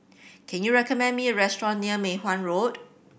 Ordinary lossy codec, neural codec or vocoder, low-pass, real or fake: none; none; none; real